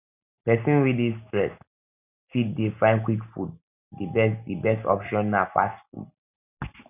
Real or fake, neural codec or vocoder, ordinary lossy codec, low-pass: real; none; none; 3.6 kHz